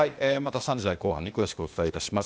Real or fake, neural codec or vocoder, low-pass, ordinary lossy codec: fake; codec, 16 kHz, 0.8 kbps, ZipCodec; none; none